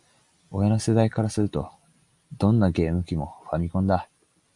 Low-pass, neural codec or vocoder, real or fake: 10.8 kHz; none; real